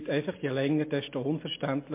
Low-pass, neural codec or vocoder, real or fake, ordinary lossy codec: 3.6 kHz; none; real; MP3, 24 kbps